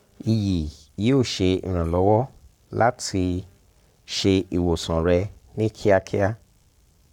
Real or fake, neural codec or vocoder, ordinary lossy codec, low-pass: fake; codec, 44.1 kHz, 7.8 kbps, Pupu-Codec; none; 19.8 kHz